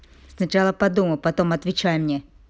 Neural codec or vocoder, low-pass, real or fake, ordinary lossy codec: none; none; real; none